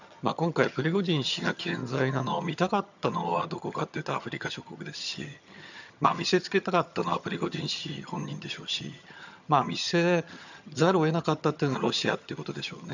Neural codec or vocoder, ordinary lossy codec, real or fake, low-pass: vocoder, 22.05 kHz, 80 mel bands, HiFi-GAN; none; fake; 7.2 kHz